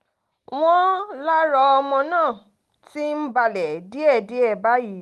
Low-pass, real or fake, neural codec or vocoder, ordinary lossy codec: 14.4 kHz; real; none; Opus, 32 kbps